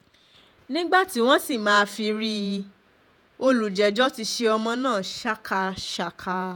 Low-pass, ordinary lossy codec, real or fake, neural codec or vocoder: 19.8 kHz; none; fake; vocoder, 48 kHz, 128 mel bands, Vocos